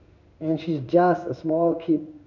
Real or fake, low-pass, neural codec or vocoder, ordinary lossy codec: fake; 7.2 kHz; codec, 16 kHz in and 24 kHz out, 1 kbps, XY-Tokenizer; none